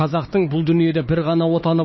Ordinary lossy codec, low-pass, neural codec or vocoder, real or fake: MP3, 24 kbps; 7.2 kHz; codec, 16 kHz, 4 kbps, X-Codec, HuBERT features, trained on LibriSpeech; fake